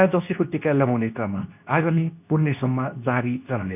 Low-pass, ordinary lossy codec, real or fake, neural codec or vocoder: 3.6 kHz; none; fake; codec, 24 kHz, 0.9 kbps, WavTokenizer, medium speech release version 2